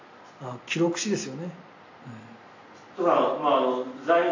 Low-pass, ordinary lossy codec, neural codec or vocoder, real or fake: 7.2 kHz; AAC, 48 kbps; none; real